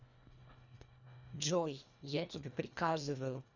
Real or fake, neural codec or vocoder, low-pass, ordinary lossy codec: fake; codec, 24 kHz, 1.5 kbps, HILCodec; 7.2 kHz; none